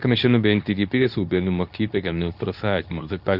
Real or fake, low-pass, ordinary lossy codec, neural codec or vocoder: fake; 5.4 kHz; none; codec, 24 kHz, 0.9 kbps, WavTokenizer, medium speech release version 2